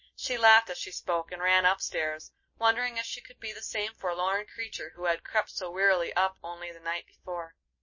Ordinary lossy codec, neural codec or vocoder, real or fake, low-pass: MP3, 32 kbps; none; real; 7.2 kHz